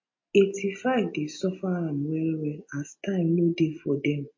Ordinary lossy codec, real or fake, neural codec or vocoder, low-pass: MP3, 32 kbps; real; none; 7.2 kHz